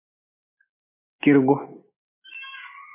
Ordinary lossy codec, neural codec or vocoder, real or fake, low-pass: MP3, 24 kbps; none; real; 3.6 kHz